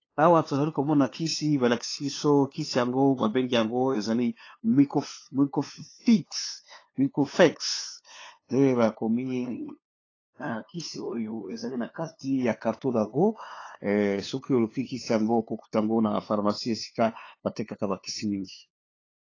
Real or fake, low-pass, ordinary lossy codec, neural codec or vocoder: fake; 7.2 kHz; AAC, 32 kbps; codec, 16 kHz, 2 kbps, FunCodec, trained on LibriTTS, 25 frames a second